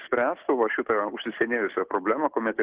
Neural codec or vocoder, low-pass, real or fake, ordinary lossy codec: none; 3.6 kHz; real; Opus, 16 kbps